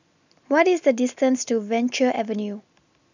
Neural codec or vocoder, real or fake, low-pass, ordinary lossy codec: none; real; 7.2 kHz; none